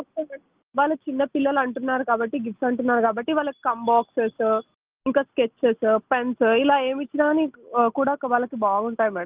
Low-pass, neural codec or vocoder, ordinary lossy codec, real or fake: 3.6 kHz; none; Opus, 32 kbps; real